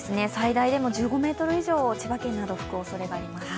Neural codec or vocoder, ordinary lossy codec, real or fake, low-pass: none; none; real; none